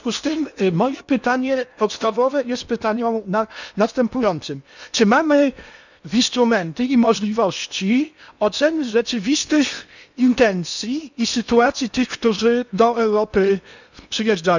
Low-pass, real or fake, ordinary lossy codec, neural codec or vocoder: 7.2 kHz; fake; none; codec, 16 kHz in and 24 kHz out, 0.8 kbps, FocalCodec, streaming, 65536 codes